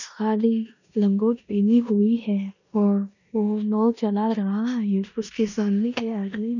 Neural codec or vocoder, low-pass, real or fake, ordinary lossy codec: codec, 16 kHz in and 24 kHz out, 0.9 kbps, LongCat-Audio-Codec, four codebook decoder; 7.2 kHz; fake; none